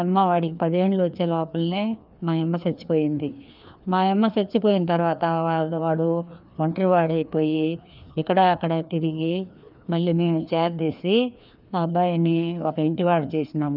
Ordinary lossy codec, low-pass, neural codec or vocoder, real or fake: none; 5.4 kHz; codec, 16 kHz, 2 kbps, FreqCodec, larger model; fake